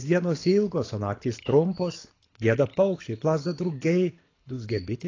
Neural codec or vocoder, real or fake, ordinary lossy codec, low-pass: codec, 24 kHz, 6 kbps, HILCodec; fake; AAC, 32 kbps; 7.2 kHz